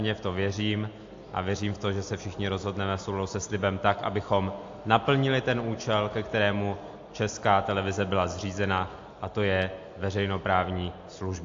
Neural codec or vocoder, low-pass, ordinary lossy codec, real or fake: none; 7.2 kHz; AAC, 48 kbps; real